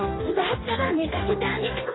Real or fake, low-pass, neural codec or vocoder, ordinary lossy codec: fake; 7.2 kHz; codec, 44.1 kHz, 2.6 kbps, DAC; AAC, 16 kbps